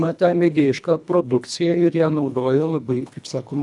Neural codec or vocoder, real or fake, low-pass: codec, 24 kHz, 1.5 kbps, HILCodec; fake; 10.8 kHz